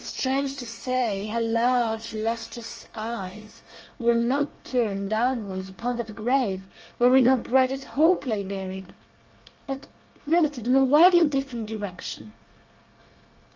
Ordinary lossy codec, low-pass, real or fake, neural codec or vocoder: Opus, 24 kbps; 7.2 kHz; fake; codec, 24 kHz, 1 kbps, SNAC